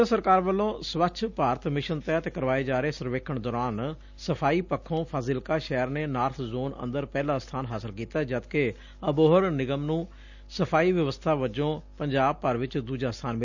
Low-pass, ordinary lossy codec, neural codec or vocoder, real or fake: 7.2 kHz; none; none; real